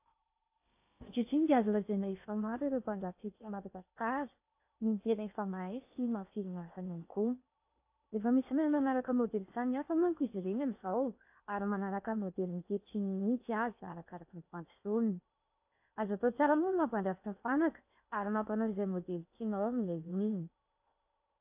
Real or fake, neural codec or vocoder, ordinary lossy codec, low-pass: fake; codec, 16 kHz in and 24 kHz out, 0.8 kbps, FocalCodec, streaming, 65536 codes; AAC, 32 kbps; 3.6 kHz